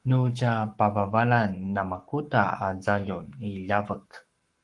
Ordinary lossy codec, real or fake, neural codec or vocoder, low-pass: Opus, 24 kbps; fake; codec, 44.1 kHz, 7.8 kbps, Pupu-Codec; 10.8 kHz